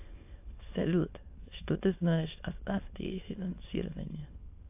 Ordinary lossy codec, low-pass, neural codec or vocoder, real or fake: AAC, 32 kbps; 3.6 kHz; autoencoder, 22.05 kHz, a latent of 192 numbers a frame, VITS, trained on many speakers; fake